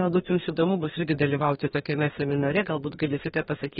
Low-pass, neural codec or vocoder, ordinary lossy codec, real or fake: 14.4 kHz; codec, 32 kHz, 1.9 kbps, SNAC; AAC, 16 kbps; fake